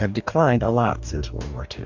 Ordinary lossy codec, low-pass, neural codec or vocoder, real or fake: Opus, 64 kbps; 7.2 kHz; codec, 44.1 kHz, 2.6 kbps, DAC; fake